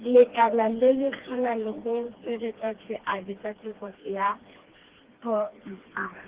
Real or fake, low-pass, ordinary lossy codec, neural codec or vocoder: fake; 3.6 kHz; Opus, 16 kbps; codec, 16 kHz, 2 kbps, FreqCodec, smaller model